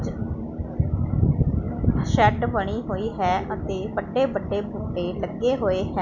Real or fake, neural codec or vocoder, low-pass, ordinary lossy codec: real; none; 7.2 kHz; none